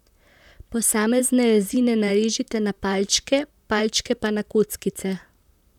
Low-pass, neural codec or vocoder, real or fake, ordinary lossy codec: 19.8 kHz; vocoder, 44.1 kHz, 128 mel bands, Pupu-Vocoder; fake; none